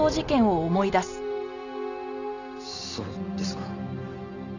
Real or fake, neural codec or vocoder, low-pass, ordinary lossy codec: real; none; 7.2 kHz; none